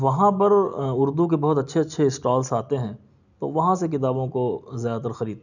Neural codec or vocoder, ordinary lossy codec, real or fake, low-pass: none; none; real; 7.2 kHz